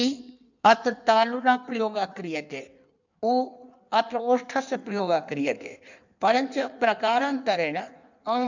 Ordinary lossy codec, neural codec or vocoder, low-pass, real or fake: none; codec, 16 kHz in and 24 kHz out, 1.1 kbps, FireRedTTS-2 codec; 7.2 kHz; fake